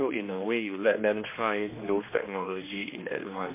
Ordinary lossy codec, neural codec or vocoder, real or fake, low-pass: MP3, 24 kbps; codec, 16 kHz, 2 kbps, X-Codec, HuBERT features, trained on general audio; fake; 3.6 kHz